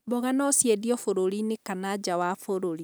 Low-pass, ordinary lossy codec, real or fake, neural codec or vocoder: none; none; real; none